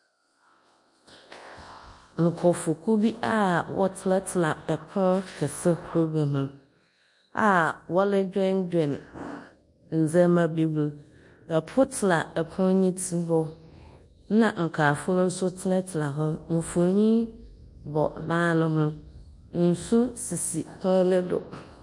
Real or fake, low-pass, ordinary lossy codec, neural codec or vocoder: fake; 10.8 kHz; MP3, 48 kbps; codec, 24 kHz, 0.9 kbps, WavTokenizer, large speech release